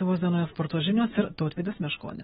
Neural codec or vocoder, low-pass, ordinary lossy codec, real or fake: autoencoder, 48 kHz, 128 numbers a frame, DAC-VAE, trained on Japanese speech; 19.8 kHz; AAC, 16 kbps; fake